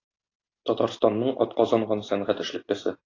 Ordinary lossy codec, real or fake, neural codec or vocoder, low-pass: AAC, 32 kbps; real; none; 7.2 kHz